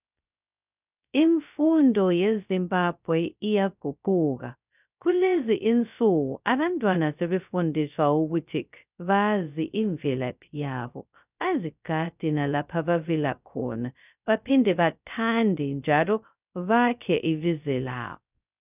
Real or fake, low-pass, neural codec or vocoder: fake; 3.6 kHz; codec, 16 kHz, 0.2 kbps, FocalCodec